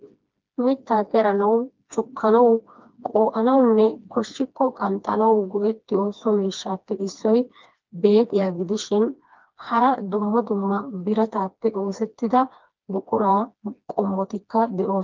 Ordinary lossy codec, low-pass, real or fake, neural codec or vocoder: Opus, 24 kbps; 7.2 kHz; fake; codec, 16 kHz, 2 kbps, FreqCodec, smaller model